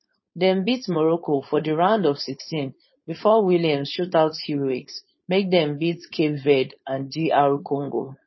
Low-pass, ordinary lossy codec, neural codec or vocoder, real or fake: 7.2 kHz; MP3, 24 kbps; codec, 16 kHz, 4.8 kbps, FACodec; fake